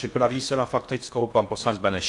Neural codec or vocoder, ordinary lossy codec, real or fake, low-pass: codec, 16 kHz in and 24 kHz out, 0.8 kbps, FocalCodec, streaming, 65536 codes; AAC, 48 kbps; fake; 10.8 kHz